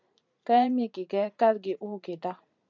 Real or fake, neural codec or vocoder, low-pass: fake; vocoder, 44.1 kHz, 128 mel bands every 512 samples, BigVGAN v2; 7.2 kHz